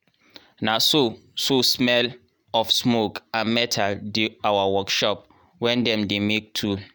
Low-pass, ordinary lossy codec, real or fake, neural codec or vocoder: none; none; real; none